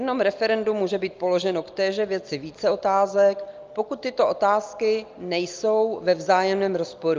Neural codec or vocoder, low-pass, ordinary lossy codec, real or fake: none; 7.2 kHz; Opus, 24 kbps; real